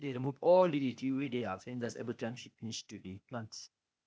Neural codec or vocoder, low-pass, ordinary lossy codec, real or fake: codec, 16 kHz, 0.8 kbps, ZipCodec; none; none; fake